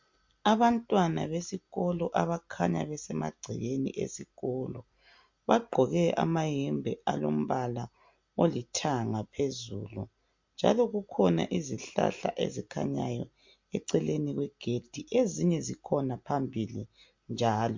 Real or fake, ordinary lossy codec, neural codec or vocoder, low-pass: real; MP3, 48 kbps; none; 7.2 kHz